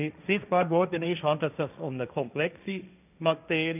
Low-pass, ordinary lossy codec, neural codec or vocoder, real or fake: 3.6 kHz; none; codec, 16 kHz, 1.1 kbps, Voila-Tokenizer; fake